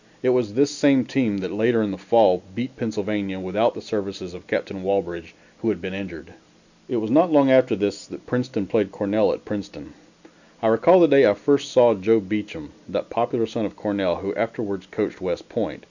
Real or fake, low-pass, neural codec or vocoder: real; 7.2 kHz; none